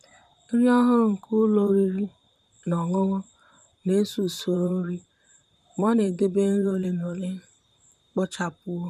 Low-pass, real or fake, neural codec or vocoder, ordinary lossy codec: 14.4 kHz; fake; vocoder, 44.1 kHz, 128 mel bands, Pupu-Vocoder; none